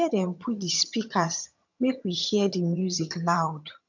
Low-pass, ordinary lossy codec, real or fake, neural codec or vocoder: 7.2 kHz; none; fake; vocoder, 22.05 kHz, 80 mel bands, HiFi-GAN